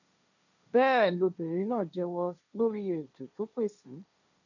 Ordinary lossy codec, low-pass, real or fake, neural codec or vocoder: none; 7.2 kHz; fake; codec, 16 kHz, 1.1 kbps, Voila-Tokenizer